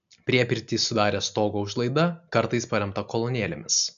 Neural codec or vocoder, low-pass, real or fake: none; 7.2 kHz; real